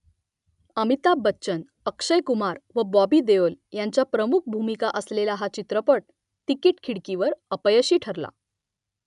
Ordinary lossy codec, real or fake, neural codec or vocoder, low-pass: none; real; none; 10.8 kHz